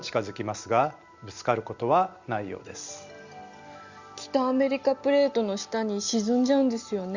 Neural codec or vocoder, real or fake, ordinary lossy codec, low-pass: none; real; Opus, 64 kbps; 7.2 kHz